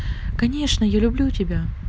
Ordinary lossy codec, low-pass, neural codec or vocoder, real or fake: none; none; none; real